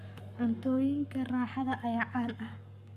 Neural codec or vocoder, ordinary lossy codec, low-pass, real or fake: codec, 44.1 kHz, 7.8 kbps, Pupu-Codec; Opus, 64 kbps; 14.4 kHz; fake